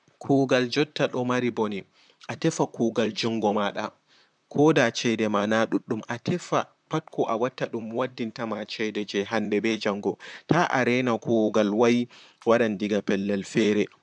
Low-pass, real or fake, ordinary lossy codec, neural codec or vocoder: 9.9 kHz; fake; none; vocoder, 44.1 kHz, 128 mel bands, Pupu-Vocoder